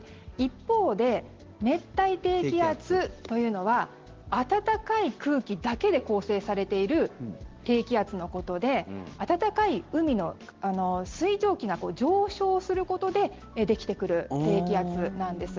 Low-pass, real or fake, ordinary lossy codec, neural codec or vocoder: 7.2 kHz; real; Opus, 16 kbps; none